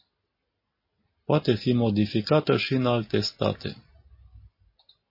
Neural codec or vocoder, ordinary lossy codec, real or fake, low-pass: none; MP3, 24 kbps; real; 5.4 kHz